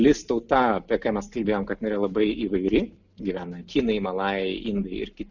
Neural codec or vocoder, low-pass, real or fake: none; 7.2 kHz; real